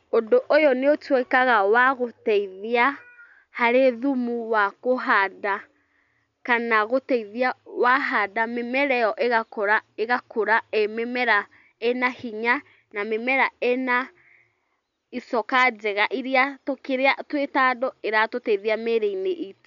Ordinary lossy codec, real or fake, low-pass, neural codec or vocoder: none; real; 7.2 kHz; none